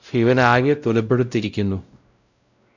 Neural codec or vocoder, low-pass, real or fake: codec, 16 kHz, 0.5 kbps, X-Codec, WavLM features, trained on Multilingual LibriSpeech; 7.2 kHz; fake